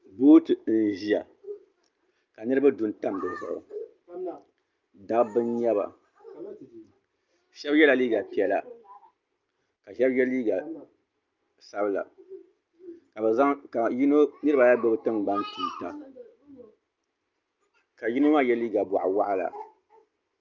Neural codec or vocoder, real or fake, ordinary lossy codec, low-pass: none; real; Opus, 24 kbps; 7.2 kHz